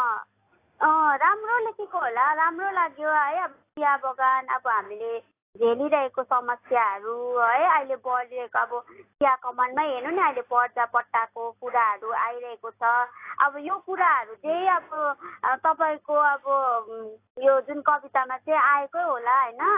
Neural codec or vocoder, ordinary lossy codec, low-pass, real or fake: none; AAC, 24 kbps; 3.6 kHz; real